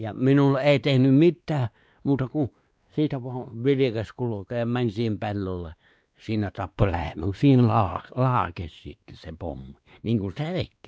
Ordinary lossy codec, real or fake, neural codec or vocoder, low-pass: none; fake; codec, 16 kHz, 2 kbps, X-Codec, WavLM features, trained on Multilingual LibriSpeech; none